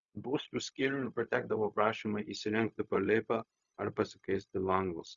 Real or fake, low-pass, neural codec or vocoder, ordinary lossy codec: fake; 7.2 kHz; codec, 16 kHz, 0.4 kbps, LongCat-Audio-Codec; Opus, 64 kbps